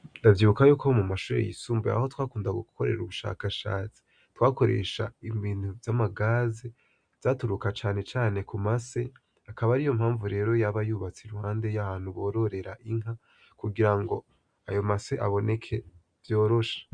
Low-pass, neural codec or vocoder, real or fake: 9.9 kHz; none; real